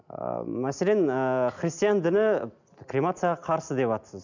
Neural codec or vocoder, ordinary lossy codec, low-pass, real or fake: none; none; 7.2 kHz; real